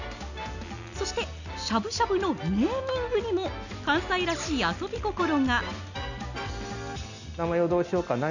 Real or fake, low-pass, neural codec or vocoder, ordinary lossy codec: real; 7.2 kHz; none; none